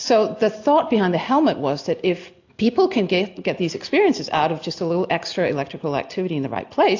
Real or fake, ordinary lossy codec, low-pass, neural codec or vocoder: real; AAC, 48 kbps; 7.2 kHz; none